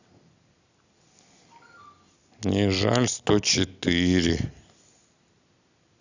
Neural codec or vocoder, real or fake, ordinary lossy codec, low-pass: none; real; none; 7.2 kHz